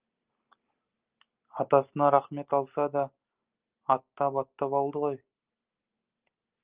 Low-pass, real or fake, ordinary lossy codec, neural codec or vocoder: 3.6 kHz; real; Opus, 32 kbps; none